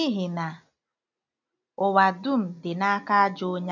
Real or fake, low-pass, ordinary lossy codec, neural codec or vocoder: real; 7.2 kHz; none; none